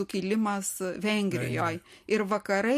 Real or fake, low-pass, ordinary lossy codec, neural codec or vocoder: real; 14.4 kHz; MP3, 64 kbps; none